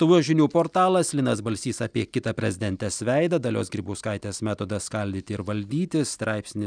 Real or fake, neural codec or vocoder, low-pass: real; none; 9.9 kHz